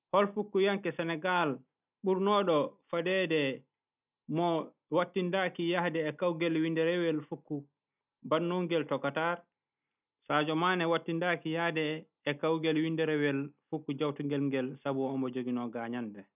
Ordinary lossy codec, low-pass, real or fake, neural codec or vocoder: none; 3.6 kHz; real; none